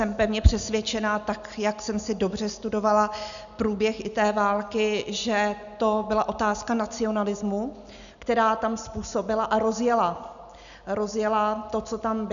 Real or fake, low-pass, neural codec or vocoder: real; 7.2 kHz; none